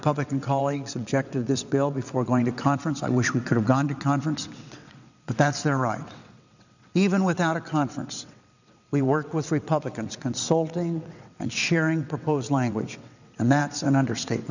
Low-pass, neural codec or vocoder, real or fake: 7.2 kHz; vocoder, 22.05 kHz, 80 mel bands, WaveNeXt; fake